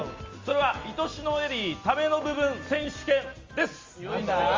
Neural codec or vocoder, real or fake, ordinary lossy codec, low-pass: none; real; Opus, 32 kbps; 7.2 kHz